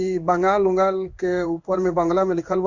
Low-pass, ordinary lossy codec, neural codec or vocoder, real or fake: 7.2 kHz; Opus, 64 kbps; codec, 16 kHz in and 24 kHz out, 1 kbps, XY-Tokenizer; fake